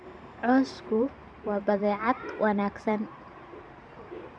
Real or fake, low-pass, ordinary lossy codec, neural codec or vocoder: fake; 9.9 kHz; none; vocoder, 44.1 kHz, 128 mel bands every 512 samples, BigVGAN v2